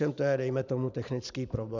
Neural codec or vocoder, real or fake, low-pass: vocoder, 44.1 kHz, 128 mel bands every 512 samples, BigVGAN v2; fake; 7.2 kHz